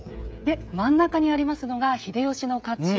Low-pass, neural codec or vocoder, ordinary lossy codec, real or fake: none; codec, 16 kHz, 16 kbps, FreqCodec, smaller model; none; fake